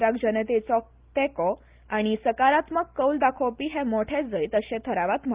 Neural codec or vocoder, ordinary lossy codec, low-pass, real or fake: none; Opus, 32 kbps; 3.6 kHz; real